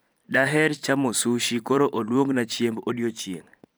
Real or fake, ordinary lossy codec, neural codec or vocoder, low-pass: fake; none; vocoder, 44.1 kHz, 128 mel bands every 512 samples, BigVGAN v2; none